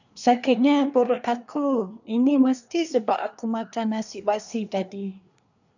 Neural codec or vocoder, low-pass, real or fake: codec, 24 kHz, 1 kbps, SNAC; 7.2 kHz; fake